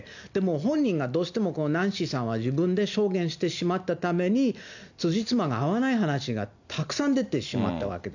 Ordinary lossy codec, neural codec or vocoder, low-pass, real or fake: none; none; 7.2 kHz; real